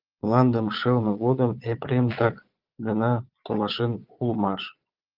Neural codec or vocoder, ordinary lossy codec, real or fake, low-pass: vocoder, 22.05 kHz, 80 mel bands, Vocos; Opus, 24 kbps; fake; 5.4 kHz